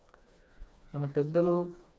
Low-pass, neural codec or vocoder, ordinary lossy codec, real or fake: none; codec, 16 kHz, 2 kbps, FreqCodec, smaller model; none; fake